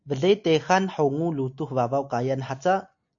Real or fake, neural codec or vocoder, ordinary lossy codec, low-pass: real; none; AAC, 64 kbps; 7.2 kHz